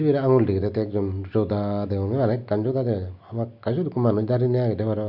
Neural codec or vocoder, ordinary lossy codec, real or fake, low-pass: none; none; real; 5.4 kHz